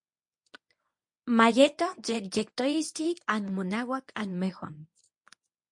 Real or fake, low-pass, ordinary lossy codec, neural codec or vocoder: fake; 10.8 kHz; MP3, 48 kbps; codec, 24 kHz, 0.9 kbps, WavTokenizer, medium speech release version 1